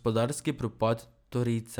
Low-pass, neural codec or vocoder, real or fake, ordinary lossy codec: none; none; real; none